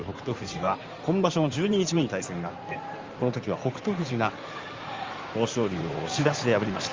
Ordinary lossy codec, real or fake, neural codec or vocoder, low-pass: Opus, 32 kbps; fake; codec, 16 kHz in and 24 kHz out, 2.2 kbps, FireRedTTS-2 codec; 7.2 kHz